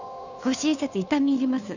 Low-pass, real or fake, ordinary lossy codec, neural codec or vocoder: 7.2 kHz; fake; none; codec, 16 kHz, 2 kbps, FunCodec, trained on Chinese and English, 25 frames a second